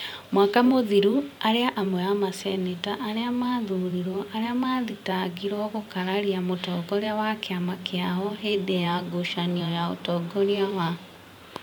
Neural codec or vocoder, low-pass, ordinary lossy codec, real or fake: vocoder, 44.1 kHz, 128 mel bands every 512 samples, BigVGAN v2; none; none; fake